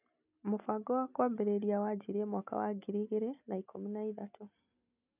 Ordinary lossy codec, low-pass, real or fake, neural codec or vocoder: none; 3.6 kHz; real; none